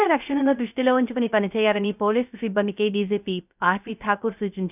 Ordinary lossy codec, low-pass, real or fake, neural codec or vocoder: none; 3.6 kHz; fake; codec, 16 kHz, 0.3 kbps, FocalCodec